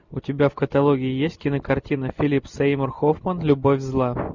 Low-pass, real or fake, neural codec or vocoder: 7.2 kHz; real; none